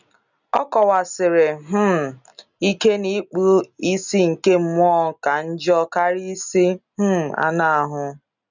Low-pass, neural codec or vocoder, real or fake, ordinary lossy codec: 7.2 kHz; none; real; none